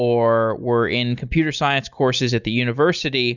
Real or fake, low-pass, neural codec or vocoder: real; 7.2 kHz; none